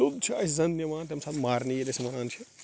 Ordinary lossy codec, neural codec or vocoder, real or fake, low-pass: none; none; real; none